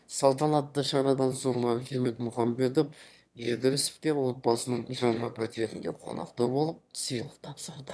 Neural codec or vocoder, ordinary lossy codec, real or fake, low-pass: autoencoder, 22.05 kHz, a latent of 192 numbers a frame, VITS, trained on one speaker; none; fake; none